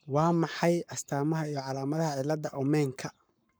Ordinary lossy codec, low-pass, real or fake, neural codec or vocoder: none; none; fake; codec, 44.1 kHz, 7.8 kbps, Pupu-Codec